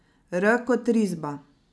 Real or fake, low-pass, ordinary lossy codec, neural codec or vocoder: real; none; none; none